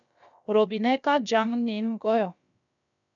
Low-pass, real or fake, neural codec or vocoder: 7.2 kHz; fake; codec, 16 kHz, about 1 kbps, DyCAST, with the encoder's durations